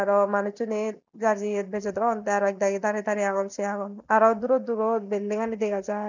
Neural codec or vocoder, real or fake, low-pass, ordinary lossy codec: none; real; 7.2 kHz; none